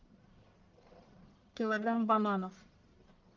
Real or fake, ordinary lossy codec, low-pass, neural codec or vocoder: fake; Opus, 32 kbps; 7.2 kHz; codec, 44.1 kHz, 1.7 kbps, Pupu-Codec